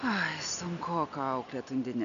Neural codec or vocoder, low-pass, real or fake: none; 7.2 kHz; real